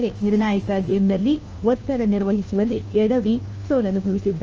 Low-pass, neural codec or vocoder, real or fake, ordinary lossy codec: 7.2 kHz; codec, 16 kHz, 1 kbps, FunCodec, trained on LibriTTS, 50 frames a second; fake; Opus, 24 kbps